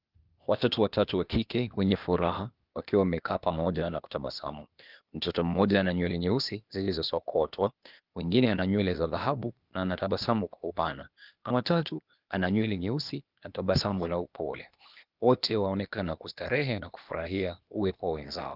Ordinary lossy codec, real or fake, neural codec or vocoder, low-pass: Opus, 24 kbps; fake; codec, 16 kHz, 0.8 kbps, ZipCodec; 5.4 kHz